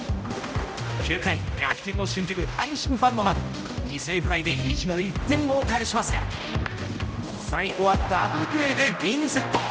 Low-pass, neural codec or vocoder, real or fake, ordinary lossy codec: none; codec, 16 kHz, 0.5 kbps, X-Codec, HuBERT features, trained on general audio; fake; none